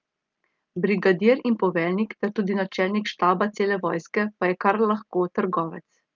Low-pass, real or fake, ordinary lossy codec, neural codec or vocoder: 7.2 kHz; real; Opus, 24 kbps; none